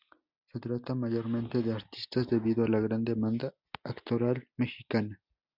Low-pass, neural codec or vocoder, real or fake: 5.4 kHz; none; real